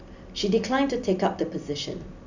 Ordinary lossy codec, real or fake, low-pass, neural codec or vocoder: none; real; 7.2 kHz; none